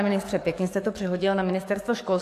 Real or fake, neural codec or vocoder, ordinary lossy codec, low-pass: fake; codec, 44.1 kHz, 7.8 kbps, DAC; AAC, 64 kbps; 14.4 kHz